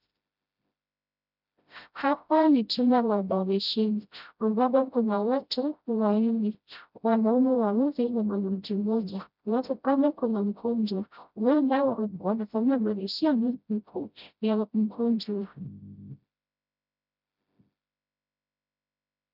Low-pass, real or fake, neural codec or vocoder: 5.4 kHz; fake; codec, 16 kHz, 0.5 kbps, FreqCodec, smaller model